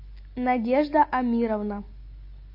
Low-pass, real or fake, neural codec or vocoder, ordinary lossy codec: 5.4 kHz; real; none; MP3, 32 kbps